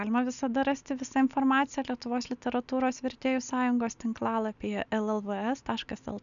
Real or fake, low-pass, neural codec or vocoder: real; 7.2 kHz; none